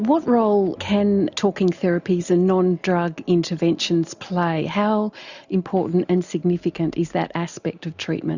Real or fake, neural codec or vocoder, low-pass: real; none; 7.2 kHz